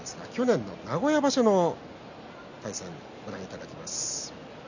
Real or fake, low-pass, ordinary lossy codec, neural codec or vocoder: real; 7.2 kHz; none; none